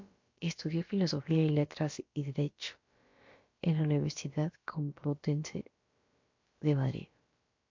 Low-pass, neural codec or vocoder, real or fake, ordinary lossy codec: 7.2 kHz; codec, 16 kHz, about 1 kbps, DyCAST, with the encoder's durations; fake; MP3, 48 kbps